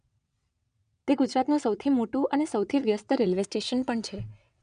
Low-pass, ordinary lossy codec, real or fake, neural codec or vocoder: 9.9 kHz; none; fake; vocoder, 22.05 kHz, 80 mel bands, WaveNeXt